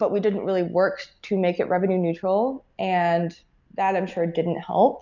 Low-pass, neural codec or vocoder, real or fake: 7.2 kHz; none; real